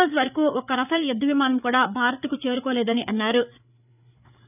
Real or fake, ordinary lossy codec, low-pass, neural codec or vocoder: fake; none; 3.6 kHz; codec, 16 kHz, 8 kbps, FreqCodec, larger model